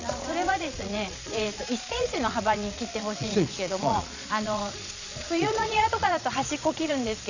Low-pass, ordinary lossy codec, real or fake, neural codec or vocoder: 7.2 kHz; none; fake; vocoder, 22.05 kHz, 80 mel bands, Vocos